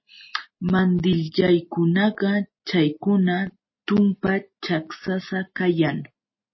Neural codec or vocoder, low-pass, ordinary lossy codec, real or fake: none; 7.2 kHz; MP3, 24 kbps; real